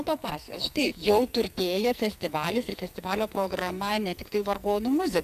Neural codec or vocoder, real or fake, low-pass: codec, 44.1 kHz, 2.6 kbps, SNAC; fake; 14.4 kHz